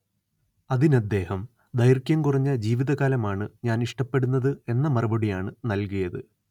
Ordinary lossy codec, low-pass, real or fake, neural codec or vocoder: none; 19.8 kHz; real; none